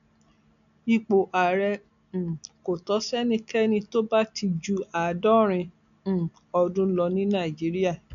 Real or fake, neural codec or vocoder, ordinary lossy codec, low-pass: real; none; none; 7.2 kHz